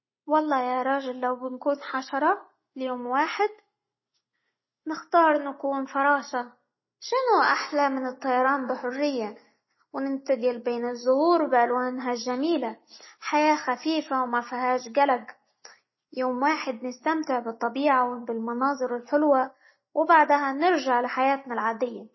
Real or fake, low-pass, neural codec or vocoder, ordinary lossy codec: real; 7.2 kHz; none; MP3, 24 kbps